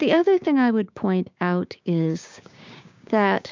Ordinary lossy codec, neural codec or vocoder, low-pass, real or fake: MP3, 64 kbps; codec, 16 kHz, 6 kbps, DAC; 7.2 kHz; fake